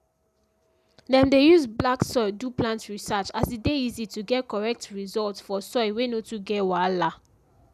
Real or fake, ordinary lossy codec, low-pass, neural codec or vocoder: real; none; 14.4 kHz; none